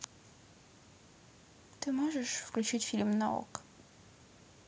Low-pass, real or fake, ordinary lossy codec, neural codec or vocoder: none; real; none; none